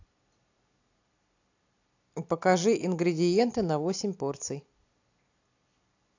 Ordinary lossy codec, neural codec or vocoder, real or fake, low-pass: AAC, 48 kbps; none; real; 7.2 kHz